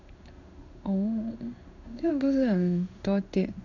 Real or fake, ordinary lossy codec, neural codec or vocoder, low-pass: fake; none; codec, 16 kHz in and 24 kHz out, 1 kbps, XY-Tokenizer; 7.2 kHz